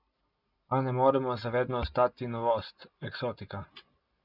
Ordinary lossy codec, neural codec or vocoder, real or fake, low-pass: none; none; real; 5.4 kHz